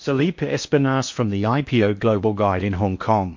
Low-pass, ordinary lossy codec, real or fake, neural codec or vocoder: 7.2 kHz; MP3, 48 kbps; fake; codec, 16 kHz in and 24 kHz out, 0.8 kbps, FocalCodec, streaming, 65536 codes